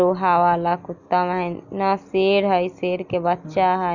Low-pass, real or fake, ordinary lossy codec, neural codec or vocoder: 7.2 kHz; real; none; none